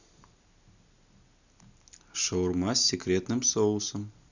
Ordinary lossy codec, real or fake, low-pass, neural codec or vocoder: none; real; 7.2 kHz; none